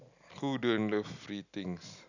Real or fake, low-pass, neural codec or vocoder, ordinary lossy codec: real; 7.2 kHz; none; none